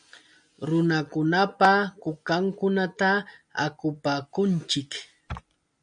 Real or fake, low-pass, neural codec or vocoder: real; 9.9 kHz; none